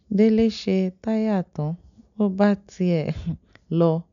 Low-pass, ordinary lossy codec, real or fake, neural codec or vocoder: 7.2 kHz; none; real; none